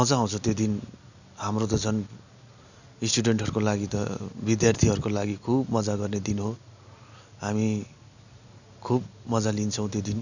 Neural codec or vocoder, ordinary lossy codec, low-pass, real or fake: none; none; 7.2 kHz; real